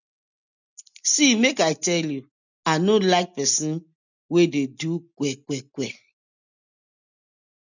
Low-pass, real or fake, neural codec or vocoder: 7.2 kHz; real; none